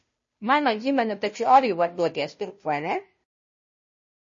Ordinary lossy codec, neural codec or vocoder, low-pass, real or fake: MP3, 32 kbps; codec, 16 kHz, 0.5 kbps, FunCodec, trained on Chinese and English, 25 frames a second; 7.2 kHz; fake